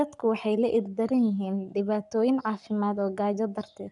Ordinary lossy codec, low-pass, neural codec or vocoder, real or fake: none; 10.8 kHz; codec, 44.1 kHz, 7.8 kbps, Pupu-Codec; fake